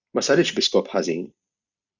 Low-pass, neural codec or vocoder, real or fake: 7.2 kHz; none; real